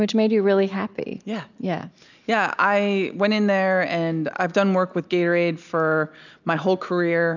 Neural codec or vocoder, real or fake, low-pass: none; real; 7.2 kHz